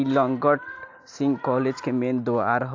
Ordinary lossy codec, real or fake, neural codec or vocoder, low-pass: AAC, 48 kbps; real; none; 7.2 kHz